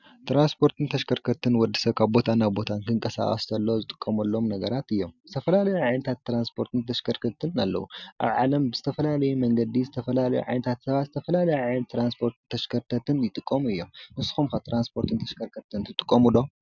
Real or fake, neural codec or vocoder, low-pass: real; none; 7.2 kHz